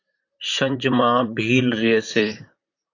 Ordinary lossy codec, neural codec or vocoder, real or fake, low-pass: AAC, 48 kbps; vocoder, 44.1 kHz, 128 mel bands, Pupu-Vocoder; fake; 7.2 kHz